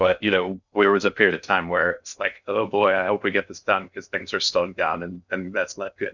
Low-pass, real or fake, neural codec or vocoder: 7.2 kHz; fake; codec, 16 kHz in and 24 kHz out, 0.6 kbps, FocalCodec, streaming, 4096 codes